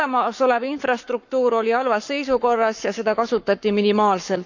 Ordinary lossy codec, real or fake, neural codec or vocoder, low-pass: none; fake; codec, 44.1 kHz, 7.8 kbps, Pupu-Codec; 7.2 kHz